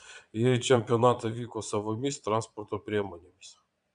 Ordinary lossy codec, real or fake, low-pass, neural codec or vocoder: AAC, 96 kbps; fake; 9.9 kHz; vocoder, 22.05 kHz, 80 mel bands, Vocos